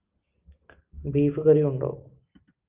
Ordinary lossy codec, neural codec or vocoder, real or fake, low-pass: Opus, 24 kbps; none; real; 3.6 kHz